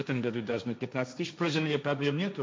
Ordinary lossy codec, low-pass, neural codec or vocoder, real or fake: none; none; codec, 16 kHz, 1.1 kbps, Voila-Tokenizer; fake